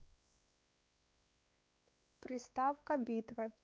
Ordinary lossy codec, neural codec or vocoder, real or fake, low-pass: none; codec, 16 kHz, 2 kbps, X-Codec, WavLM features, trained on Multilingual LibriSpeech; fake; none